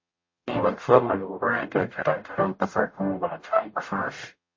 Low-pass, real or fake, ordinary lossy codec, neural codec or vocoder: 7.2 kHz; fake; MP3, 32 kbps; codec, 44.1 kHz, 0.9 kbps, DAC